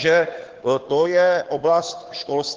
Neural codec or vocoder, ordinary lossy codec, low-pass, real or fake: codec, 16 kHz, 2 kbps, FunCodec, trained on Chinese and English, 25 frames a second; Opus, 16 kbps; 7.2 kHz; fake